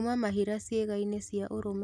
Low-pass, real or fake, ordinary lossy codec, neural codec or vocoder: none; real; none; none